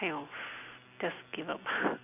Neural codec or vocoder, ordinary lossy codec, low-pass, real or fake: none; none; 3.6 kHz; real